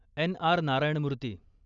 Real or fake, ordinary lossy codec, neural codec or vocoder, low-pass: real; none; none; 7.2 kHz